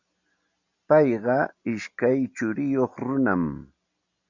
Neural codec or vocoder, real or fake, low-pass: none; real; 7.2 kHz